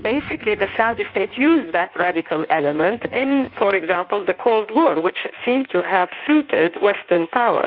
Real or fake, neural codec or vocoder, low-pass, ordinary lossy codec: fake; codec, 16 kHz in and 24 kHz out, 1.1 kbps, FireRedTTS-2 codec; 5.4 kHz; Opus, 64 kbps